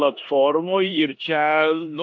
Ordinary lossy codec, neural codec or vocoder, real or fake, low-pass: AAC, 48 kbps; codec, 16 kHz in and 24 kHz out, 0.9 kbps, LongCat-Audio-Codec, four codebook decoder; fake; 7.2 kHz